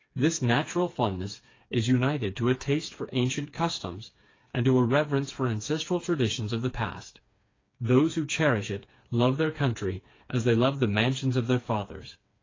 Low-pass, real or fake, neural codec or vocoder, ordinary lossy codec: 7.2 kHz; fake; codec, 16 kHz, 4 kbps, FreqCodec, smaller model; AAC, 32 kbps